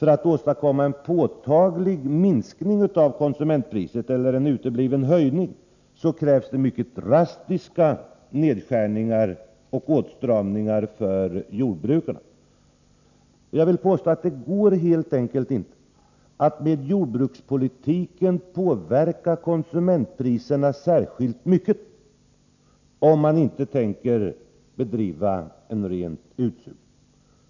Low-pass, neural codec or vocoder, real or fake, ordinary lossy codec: 7.2 kHz; none; real; none